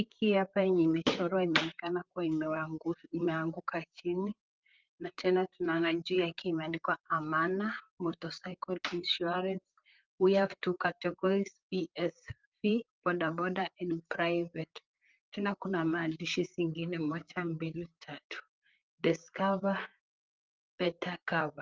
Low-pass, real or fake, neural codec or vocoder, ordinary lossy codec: 7.2 kHz; fake; vocoder, 44.1 kHz, 128 mel bands, Pupu-Vocoder; Opus, 24 kbps